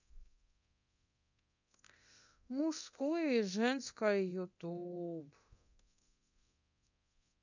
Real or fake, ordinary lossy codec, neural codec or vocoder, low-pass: fake; none; codec, 24 kHz, 0.9 kbps, DualCodec; 7.2 kHz